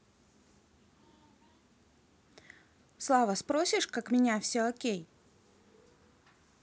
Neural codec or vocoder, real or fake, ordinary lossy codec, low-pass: none; real; none; none